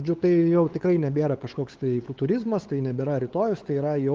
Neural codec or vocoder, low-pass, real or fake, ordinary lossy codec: codec, 16 kHz, 8 kbps, FunCodec, trained on LibriTTS, 25 frames a second; 7.2 kHz; fake; Opus, 16 kbps